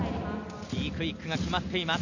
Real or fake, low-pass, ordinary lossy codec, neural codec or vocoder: real; 7.2 kHz; none; none